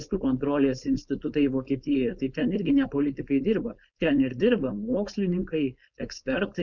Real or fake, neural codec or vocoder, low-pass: fake; codec, 16 kHz, 4.8 kbps, FACodec; 7.2 kHz